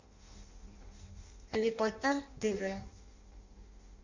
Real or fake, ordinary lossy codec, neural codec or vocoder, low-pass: fake; Opus, 64 kbps; codec, 16 kHz in and 24 kHz out, 0.6 kbps, FireRedTTS-2 codec; 7.2 kHz